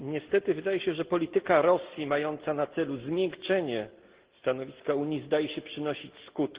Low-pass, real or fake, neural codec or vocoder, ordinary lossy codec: 3.6 kHz; real; none; Opus, 16 kbps